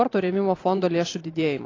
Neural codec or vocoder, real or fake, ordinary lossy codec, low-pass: vocoder, 44.1 kHz, 128 mel bands every 512 samples, BigVGAN v2; fake; AAC, 32 kbps; 7.2 kHz